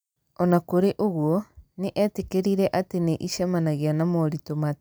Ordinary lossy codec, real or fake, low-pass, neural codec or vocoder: none; real; none; none